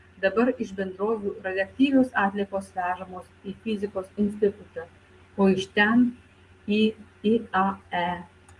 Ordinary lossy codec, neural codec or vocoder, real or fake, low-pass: Opus, 24 kbps; none; real; 10.8 kHz